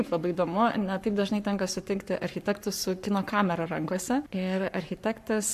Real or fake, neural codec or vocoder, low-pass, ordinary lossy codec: fake; codec, 44.1 kHz, 7.8 kbps, Pupu-Codec; 14.4 kHz; MP3, 96 kbps